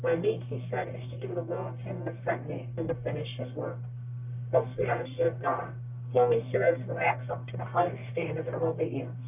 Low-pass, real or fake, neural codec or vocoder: 3.6 kHz; fake; codec, 44.1 kHz, 1.7 kbps, Pupu-Codec